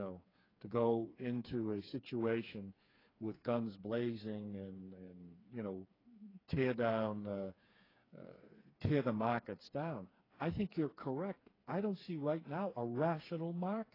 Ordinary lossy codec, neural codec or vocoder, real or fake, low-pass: AAC, 24 kbps; codec, 16 kHz, 4 kbps, FreqCodec, smaller model; fake; 5.4 kHz